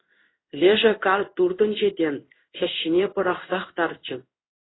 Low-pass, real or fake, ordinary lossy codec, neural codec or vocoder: 7.2 kHz; fake; AAC, 16 kbps; codec, 16 kHz in and 24 kHz out, 1 kbps, XY-Tokenizer